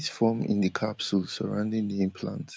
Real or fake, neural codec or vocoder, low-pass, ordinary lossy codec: real; none; none; none